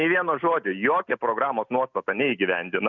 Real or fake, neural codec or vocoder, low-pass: real; none; 7.2 kHz